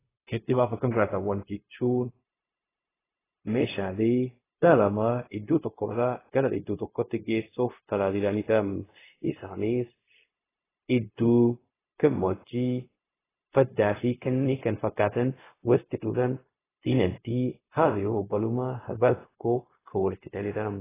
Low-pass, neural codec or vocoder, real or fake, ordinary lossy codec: 3.6 kHz; codec, 16 kHz, 0.4 kbps, LongCat-Audio-Codec; fake; AAC, 16 kbps